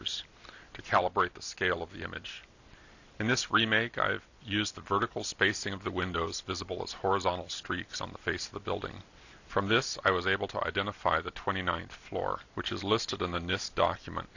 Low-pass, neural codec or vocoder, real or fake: 7.2 kHz; none; real